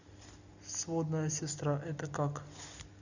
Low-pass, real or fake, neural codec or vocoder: 7.2 kHz; real; none